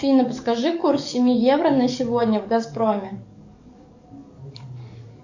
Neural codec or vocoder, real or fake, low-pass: codec, 44.1 kHz, 7.8 kbps, DAC; fake; 7.2 kHz